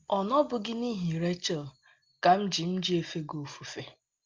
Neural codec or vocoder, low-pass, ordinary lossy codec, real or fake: none; 7.2 kHz; Opus, 32 kbps; real